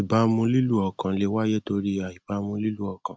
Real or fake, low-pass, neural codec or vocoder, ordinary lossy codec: real; none; none; none